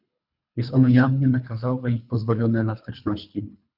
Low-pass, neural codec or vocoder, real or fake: 5.4 kHz; codec, 24 kHz, 3 kbps, HILCodec; fake